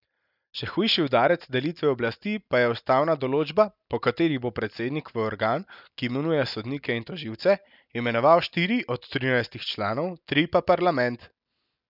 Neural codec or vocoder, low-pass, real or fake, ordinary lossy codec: none; 5.4 kHz; real; none